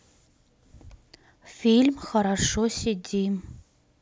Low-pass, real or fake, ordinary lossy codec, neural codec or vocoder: none; real; none; none